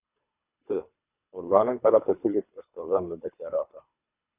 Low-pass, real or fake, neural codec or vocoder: 3.6 kHz; fake; codec, 24 kHz, 3 kbps, HILCodec